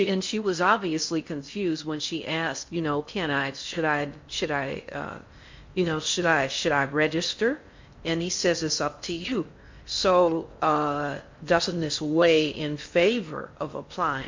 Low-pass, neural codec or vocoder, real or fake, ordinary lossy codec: 7.2 kHz; codec, 16 kHz in and 24 kHz out, 0.6 kbps, FocalCodec, streaming, 4096 codes; fake; MP3, 48 kbps